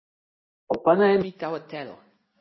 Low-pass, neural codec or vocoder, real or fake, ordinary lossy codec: 7.2 kHz; none; real; MP3, 24 kbps